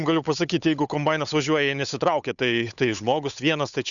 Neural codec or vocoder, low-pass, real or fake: codec, 16 kHz, 8 kbps, FunCodec, trained on Chinese and English, 25 frames a second; 7.2 kHz; fake